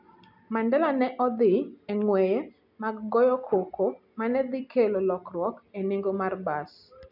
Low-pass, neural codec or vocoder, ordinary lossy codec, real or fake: 5.4 kHz; none; none; real